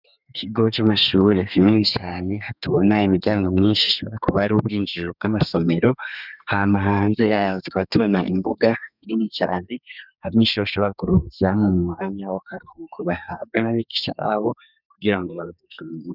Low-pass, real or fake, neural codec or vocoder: 5.4 kHz; fake; codec, 32 kHz, 1.9 kbps, SNAC